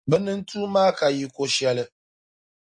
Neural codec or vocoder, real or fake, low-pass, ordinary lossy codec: none; real; 9.9 kHz; MP3, 48 kbps